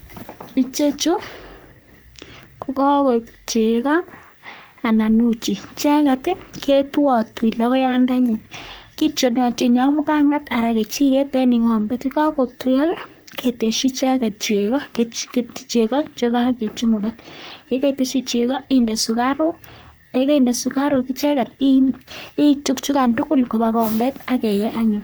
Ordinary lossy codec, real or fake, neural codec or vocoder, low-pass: none; fake; codec, 44.1 kHz, 3.4 kbps, Pupu-Codec; none